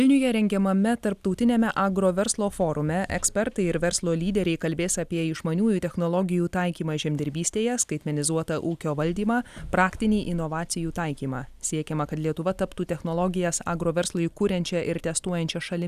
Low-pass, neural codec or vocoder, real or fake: 14.4 kHz; none; real